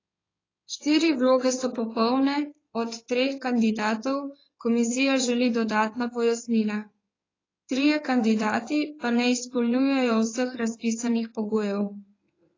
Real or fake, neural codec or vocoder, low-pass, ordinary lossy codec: fake; codec, 16 kHz in and 24 kHz out, 2.2 kbps, FireRedTTS-2 codec; 7.2 kHz; AAC, 32 kbps